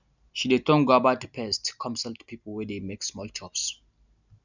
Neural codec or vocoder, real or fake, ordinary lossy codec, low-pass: none; real; none; 7.2 kHz